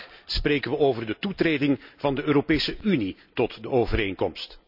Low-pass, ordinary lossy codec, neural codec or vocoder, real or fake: 5.4 kHz; none; none; real